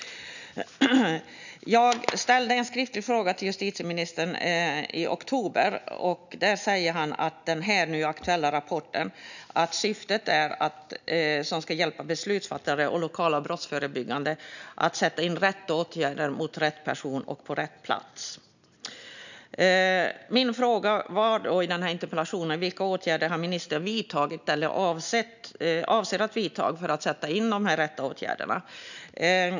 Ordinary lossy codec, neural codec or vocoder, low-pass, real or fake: none; none; 7.2 kHz; real